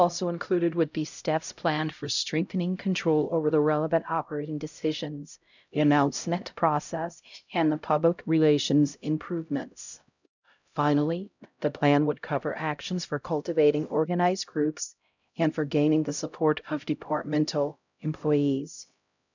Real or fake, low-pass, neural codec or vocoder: fake; 7.2 kHz; codec, 16 kHz, 0.5 kbps, X-Codec, HuBERT features, trained on LibriSpeech